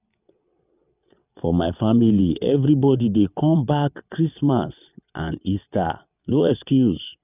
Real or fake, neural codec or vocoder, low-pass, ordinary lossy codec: fake; vocoder, 22.05 kHz, 80 mel bands, WaveNeXt; 3.6 kHz; none